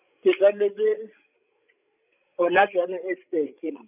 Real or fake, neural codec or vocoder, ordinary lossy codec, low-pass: fake; codec, 16 kHz, 16 kbps, FreqCodec, larger model; none; 3.6 kHz